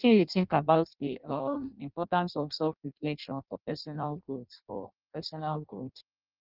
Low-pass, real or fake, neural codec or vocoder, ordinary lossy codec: 5.4 kHz; fake; codec, 16 kHz in and 24 kHz out, 0.6 kbps, FireRedTTS-2 codec; Opus, 32 kbps